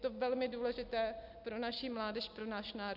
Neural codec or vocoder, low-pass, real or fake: none; 5.4 kHz; real